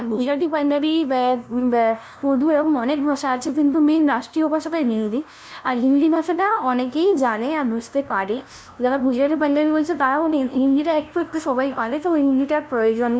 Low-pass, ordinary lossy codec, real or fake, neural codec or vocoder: none; none; fake; codec, 16 kHz, 0.5 kbps, FunCodec, trained on LibriTTS, 25 frames a second